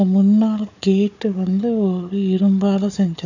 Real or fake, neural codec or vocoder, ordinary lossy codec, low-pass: fake; codec, 16 kHz, 4 kbps, FunCodec, trained on Chinese and English, 50 frames a second; none; 7.2 kHz